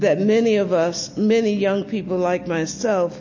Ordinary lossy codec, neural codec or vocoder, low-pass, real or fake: MP3, 32 kbps; none; 7.2 kHz; real